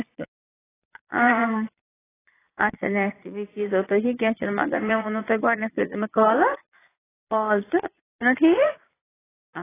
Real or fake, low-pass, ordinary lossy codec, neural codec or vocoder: fake; 3.6 kHz; AAC, 16 kbps; vocoder, 22.05 kHz, 80 mel bands, Vocos